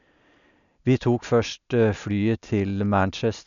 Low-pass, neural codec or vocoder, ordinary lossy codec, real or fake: 7.2 kHz; none; none; real